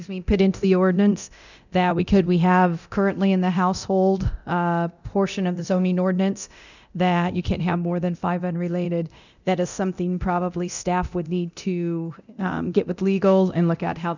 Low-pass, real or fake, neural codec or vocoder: 7.2 kHz; fake; codec, 24 kHz, 0.9 kbps, DualCodec